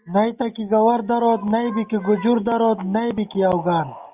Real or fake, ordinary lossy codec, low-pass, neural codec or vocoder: real; Opus, 64 kbps; 3.6 kHz; none